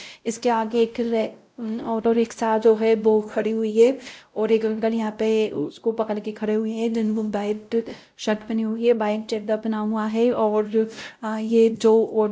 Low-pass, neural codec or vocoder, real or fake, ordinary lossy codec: none; codec, 16 kHz, 0.5 kbps, X-Codec, WavLM features, trained on Multilingual LibriSpeech; fake; none